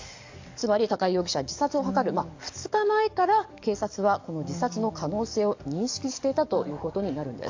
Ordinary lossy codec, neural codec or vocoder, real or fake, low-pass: AAC, 48 kbps; codec, 44.1 kHz, 7.8 kbps, DAC; fake; 7.2 kHz